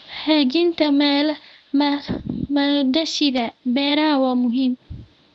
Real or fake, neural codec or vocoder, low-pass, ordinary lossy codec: fake; codec, 24 kHz, 0.9 kbps, WavTokenizer, medium speech release version 1; none; none